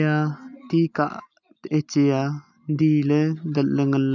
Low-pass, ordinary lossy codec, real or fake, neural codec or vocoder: 7.2 kHz; none; real; none